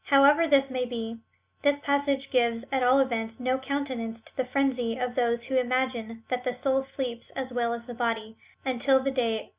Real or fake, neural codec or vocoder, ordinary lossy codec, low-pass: real; none; Opus, 64 kbps; 3.6 kHz